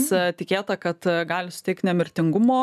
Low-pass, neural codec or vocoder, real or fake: 14.4 kHz; none; real